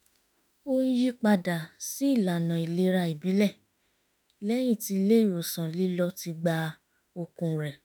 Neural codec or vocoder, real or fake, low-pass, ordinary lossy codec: autoencoder, 48 kHz, 32 numbers a frame, DAC-VAE, trained on Japanese speech; fake; none; none